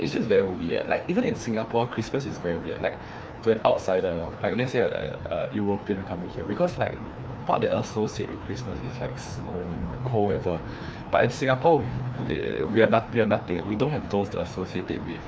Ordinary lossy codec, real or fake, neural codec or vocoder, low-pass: none; fake; codec, 16 kHz, 2 kbps, FreqCodec, larger model; none